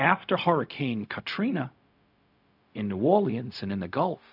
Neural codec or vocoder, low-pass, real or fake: codec, 16 kHz, 0.4 kbps, LongCat-Audio-Codec; 5.4 kHz; fake